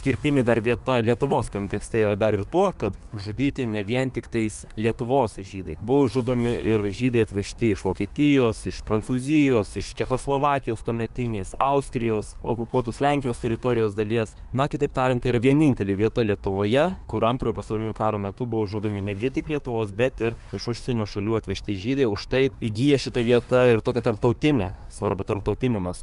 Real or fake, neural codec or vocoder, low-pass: fake; codec, 24 kHz, 1 kbps, SNAC; 10.8 kHz